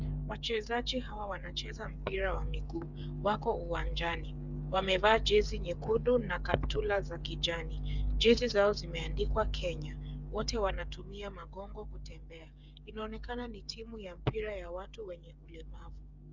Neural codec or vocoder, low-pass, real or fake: codec, 16 kHz, 8 kbps, FreqCodec, smaller model; 7.2 kHz; fake